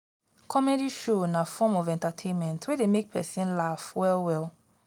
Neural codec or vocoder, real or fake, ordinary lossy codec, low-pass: none; real; none; 19.8 kHz